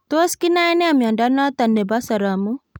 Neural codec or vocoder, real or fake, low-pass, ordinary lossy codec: none; real; none; none